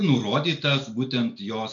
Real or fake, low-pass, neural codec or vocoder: real; 7.2 kHz; none